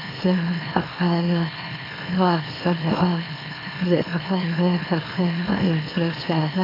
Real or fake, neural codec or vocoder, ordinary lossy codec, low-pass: fake; autoencoder, 44.1 kHz, a latent of 192 numbers a frame, MeloTTS; AAC, 24 kbps; 5.4 kHz